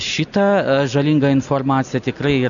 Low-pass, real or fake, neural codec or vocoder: 7.2 kHz; real; none